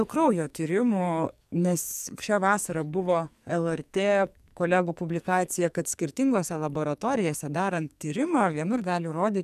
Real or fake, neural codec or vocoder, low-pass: fake; codec, 44.1 kHz, 2.6 kbps, SNAC; 14.4 kHz